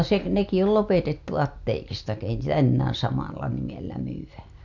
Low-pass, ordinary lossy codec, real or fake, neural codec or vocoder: 7.2 kHz; MP3, 64 kbps; real; none